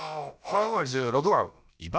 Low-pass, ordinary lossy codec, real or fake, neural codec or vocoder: none; none; fake; codec, 16 kHz, about 1 kbps, DyCAST, with the encoder's durations